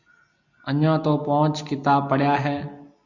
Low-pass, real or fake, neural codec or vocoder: 7.2 kHz; real; none